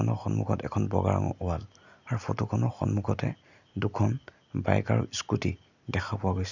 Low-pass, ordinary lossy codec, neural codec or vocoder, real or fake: 7.2 kHz; none; none; real